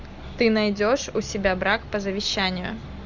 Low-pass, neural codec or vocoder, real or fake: 7.2 kHz; none; real